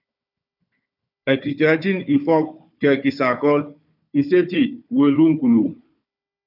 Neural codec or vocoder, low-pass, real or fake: codec, 16 kHz, 4 kbps, FunCodec, trained on Chinese and English, 50 frames a second; 5.4 kHz; fake